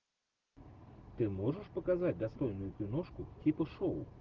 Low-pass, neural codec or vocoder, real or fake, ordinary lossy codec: 7.2 kHz; none; real; Opus, 16 kbps